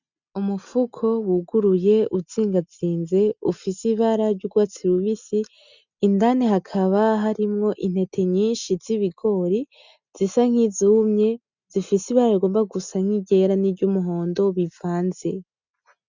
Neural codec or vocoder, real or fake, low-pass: none; real; 7.2 kHz